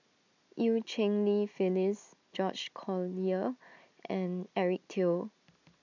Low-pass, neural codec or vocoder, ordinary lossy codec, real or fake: 7.2 kHz; none; none; real